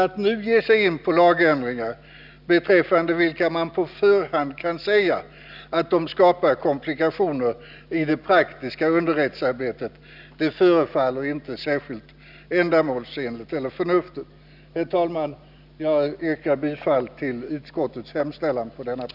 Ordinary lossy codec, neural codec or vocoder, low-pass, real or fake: none; none; 5.4 kHz; real